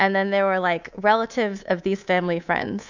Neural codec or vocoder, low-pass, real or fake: codec, 24 kHz, 3.1 kbps, DualCodec; 7.2 kHz; fake